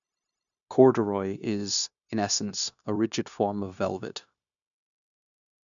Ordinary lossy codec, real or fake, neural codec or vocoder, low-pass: none; fake; codec, 16 kHz, 0.9 kbps, LongCat-Audio-Codec; 7.2 kHz